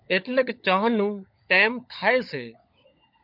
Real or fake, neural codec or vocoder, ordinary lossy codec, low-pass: fake; codec, 16 kHz, 16 kbps, FunCodec, trained on LibriTTS, 50 frames a second; MP3, 48 kbps; 5.4 kHz